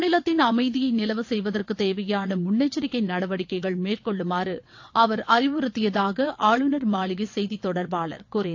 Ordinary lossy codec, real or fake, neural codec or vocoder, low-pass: AAC, 48 kbps; fake; vocoder, 22.05 kHz, 80 mel bands, WaveNeXt; 7.2 kHz